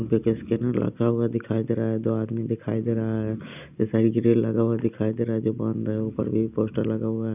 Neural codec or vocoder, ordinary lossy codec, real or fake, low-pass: none; none; real; 3.6 kHz